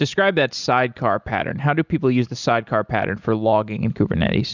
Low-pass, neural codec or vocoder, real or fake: 7.2 kHz; none; real